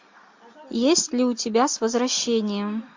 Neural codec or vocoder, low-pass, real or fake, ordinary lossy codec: none; 7.2 kHz; real; MP3, 48 kbps